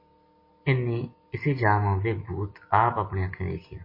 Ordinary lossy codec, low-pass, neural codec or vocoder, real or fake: MP3, 24 kbps; 5.4 kHz; none; real